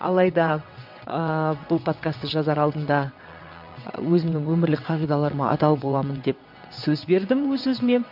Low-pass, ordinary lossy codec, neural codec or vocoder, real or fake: 5.4 kHz; MP3, 48 kbps; vocoder, 22.05 kHz, 80 mel bands, WaveNeXt; fake